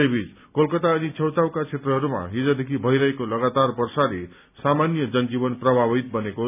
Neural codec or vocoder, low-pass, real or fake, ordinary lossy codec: none; 3.6 kHz; real; none